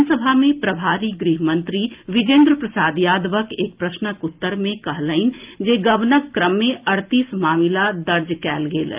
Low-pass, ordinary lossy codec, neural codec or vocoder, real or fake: 3.6 kHz; Opus, 32 kbps; none; real